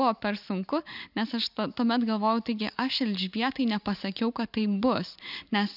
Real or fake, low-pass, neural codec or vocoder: real; 5.4 kHz; none